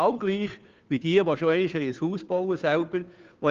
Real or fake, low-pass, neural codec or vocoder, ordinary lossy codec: fake; 7.2 kHz; codec, 16 kHz, 2 kbps, FunCodec, trained on Chinese and English, 25 frames a second; Opus, 32 kbps